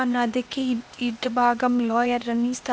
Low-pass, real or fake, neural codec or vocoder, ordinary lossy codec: none; fake; codec, 16 kHz, 0.8 kbps, ZipCodec; none